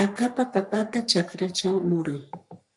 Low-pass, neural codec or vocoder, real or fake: 10.8 kHz; codec, 44.1 kHz, 3.4 kbps, Pupu-Codec; fake